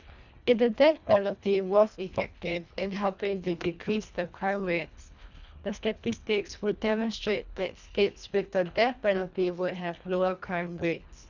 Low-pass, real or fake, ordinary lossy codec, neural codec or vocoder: 7.2 kHz; fake; none; codec, 24 kHz, 1.5 kbps, HILCodec